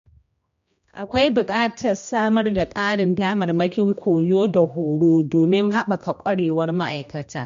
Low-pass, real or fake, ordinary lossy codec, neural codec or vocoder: 7.2 kHz; fake; MP3, 48 kbps; codec, 16 kHz, 1 kbps, X-Codec, HuBERT features, trained on general audio